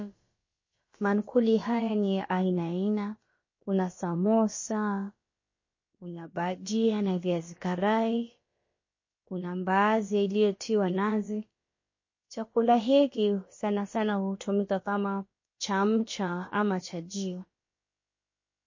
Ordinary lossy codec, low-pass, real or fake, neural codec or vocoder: MP3, 32 kbps; 7.2 kHz; fake; codec, 16 kHz, about 1 kbps, DyCAST, with the encoder's durations